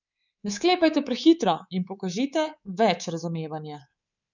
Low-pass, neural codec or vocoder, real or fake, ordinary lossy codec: 7.2 kHz; codec, 24 kHz, 3.1 kbps, DualCodec; fake; none